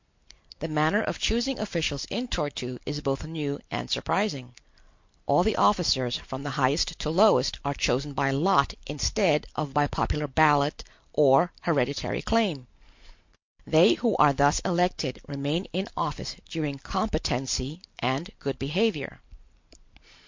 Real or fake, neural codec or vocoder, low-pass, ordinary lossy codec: real; none; 7.2 kHz; MP3, 48 kbps